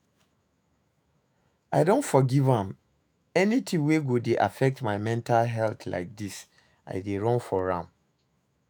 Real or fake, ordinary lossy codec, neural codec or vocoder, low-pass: fake; none; autoencoder, 48 kHz, 128 numbers a frame, DAC-VAE, trained on Japanese speech; none